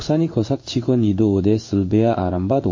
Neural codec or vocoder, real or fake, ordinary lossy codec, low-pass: codec, 16 kHz in and 24 kHz out, 1 kbps, XY-Tokenizer; fake; MP3, 32 kbps; 7.2 kHz